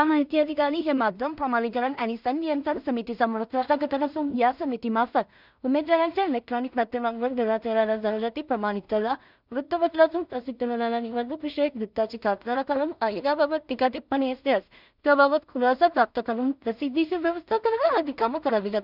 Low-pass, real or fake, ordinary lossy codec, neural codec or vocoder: 5.4 kHz; fake; none; codec, 16 kHz in and 24 kHz out, 0.4 kbps, LongCat-Audio-Codec, two codebook decoder